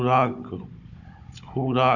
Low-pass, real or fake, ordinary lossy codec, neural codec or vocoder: 7.2 kHz; fake; none; codec, 16 kHz, 16 kbps, FunCodec, trained on Chinese and English, 50 frames a second